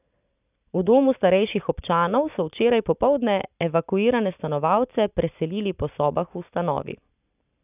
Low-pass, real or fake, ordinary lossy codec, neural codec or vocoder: 3.6 kHz; real; none; none